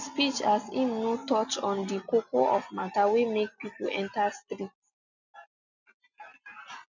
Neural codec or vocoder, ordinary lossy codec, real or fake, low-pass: none; none; real; 7.2 kHz